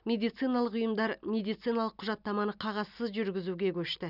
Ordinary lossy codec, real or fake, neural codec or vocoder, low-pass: none; real; none; 5.4 kHz